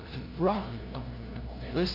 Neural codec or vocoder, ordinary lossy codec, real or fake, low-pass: codec, 16 kHz, 0.5 kbps, FunCodec, trained on LibriTTS, 25 frames a second; none; fake; 5.4 kHz